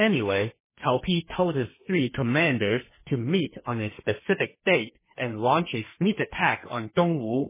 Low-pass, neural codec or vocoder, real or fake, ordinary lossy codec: 3.6 kHz; codec, 32 kHz, 1.9 kbps, SNAC; fake; MP3, 16 kbps